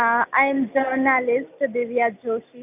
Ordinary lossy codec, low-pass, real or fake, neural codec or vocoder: none; 3.6 kHz; real; none